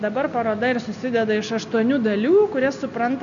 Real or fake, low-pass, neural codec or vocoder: real; 7.2 kHz; none